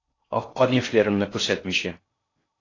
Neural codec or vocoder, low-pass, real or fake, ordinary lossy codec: codec, 16 kHz in and 24 kHz out, 0.6 kbps, FocalCodec, streaming, 4096 codes; 7.2 kHz; fake; AAC, 32 kbps